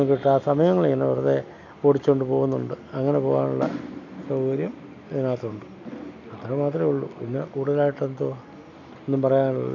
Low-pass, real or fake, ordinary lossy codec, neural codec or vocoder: 7.2 kHz; real; none; none